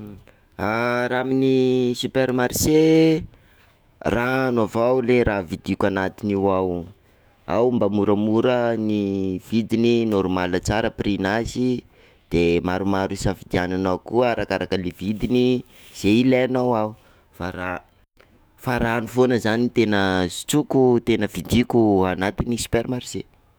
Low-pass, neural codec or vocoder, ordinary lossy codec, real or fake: none; autoencoder, 48 kHz, 128 numbers a frame, DAC-VAE, trained on Japanese speech; none; fake